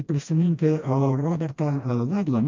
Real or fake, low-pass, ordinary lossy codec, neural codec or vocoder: fake; 7.2 kHz; MP3, 64 kbps; codec, 16 kHz, 1 kbps, FreqCodec, smaller model